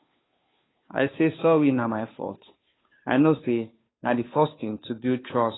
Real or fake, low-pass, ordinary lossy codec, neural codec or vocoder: fake; 7.2 kHz; AAC, 16 kbps; codec, 16 kHz, 2 kbps, FunCodec, trained on Chinese and English, 25 frames a second